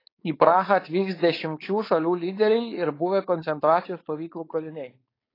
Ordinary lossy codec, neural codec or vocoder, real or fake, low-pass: AAC, 24 kbps; codec, 16 kHz, 4.8 kbps, FACodec; fake; 5.4 kHz